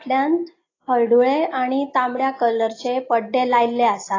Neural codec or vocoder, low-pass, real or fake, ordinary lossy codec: none; 7.2 kHz; real; AAC, 32 kbps